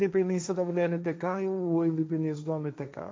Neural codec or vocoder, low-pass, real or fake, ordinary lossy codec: codec, 16 kHz, 1.1 kbps, Voila-Tokenizer; none; fake; none